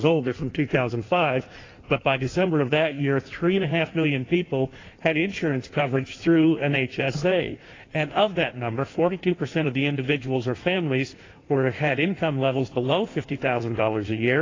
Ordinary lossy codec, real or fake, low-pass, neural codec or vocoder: AAC, 32 kbps; fake; 7.2 kHz; codec, 16 kHz in and 24 kHz out, 1.1 kbps, FireRedTTS-2 codec